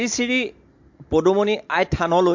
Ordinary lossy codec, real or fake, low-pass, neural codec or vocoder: MP3, 48 kbps; real; 7.2 kHz; none